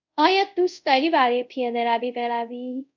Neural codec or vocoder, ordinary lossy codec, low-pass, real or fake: codec, 24 kHz, 0.5 kbps, DualCodec; MP3, 64 kbps; 7.2 kHz; fake